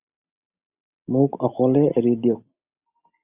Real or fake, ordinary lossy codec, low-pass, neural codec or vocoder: real; Opus, 64 kbps; 3.6 kHz; none